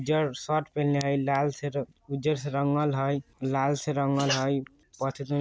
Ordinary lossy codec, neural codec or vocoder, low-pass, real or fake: none; none; none; real